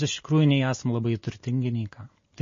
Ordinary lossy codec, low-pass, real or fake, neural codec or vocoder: MP3, 32 kbps; 7.2 kHz; real; none